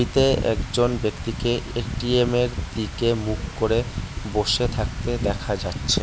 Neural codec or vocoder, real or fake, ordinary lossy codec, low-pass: none; real; none; none